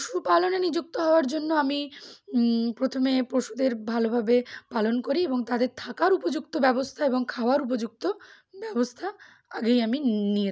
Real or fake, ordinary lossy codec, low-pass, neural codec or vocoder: real; none; none; none